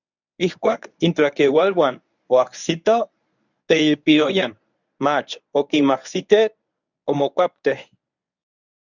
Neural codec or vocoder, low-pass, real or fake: codec, 24 kHz, 0.9 kbps, WavTokenizer, medium speech release version 1; 7.2 kHz; fake